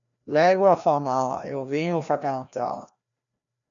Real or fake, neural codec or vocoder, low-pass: fake; codec, 16 kHz, 1 kbps, FreqCodec, larger model; 7.2 kHz